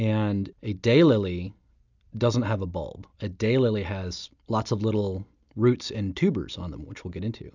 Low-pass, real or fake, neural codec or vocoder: 7.2 kHz; real; none